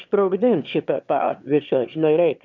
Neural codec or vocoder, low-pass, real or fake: autoencoder, 22.05 kHz, a latent of 192 numbers a frame, VITS, trained on one speaker; 7.2 kHz; fake